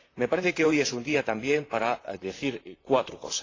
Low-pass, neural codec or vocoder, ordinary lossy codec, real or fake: 7.2 kHz; vocoder, 44.1 kHz, 128 mel bands, Pupu-Vocoder; AAC, 32 kbps; fake